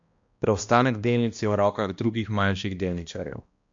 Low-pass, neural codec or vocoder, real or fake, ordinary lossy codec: 7.2 kHz; codec, 16 kHz, 1 kbps, X-Codec, HuBERT features, trained on balanced general audio; fake; MP3, 48 kbps